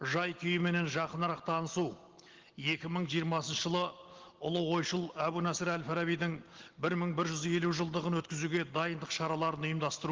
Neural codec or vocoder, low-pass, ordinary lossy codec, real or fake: none; 7.2 kHz; Opus, 16 kbps; real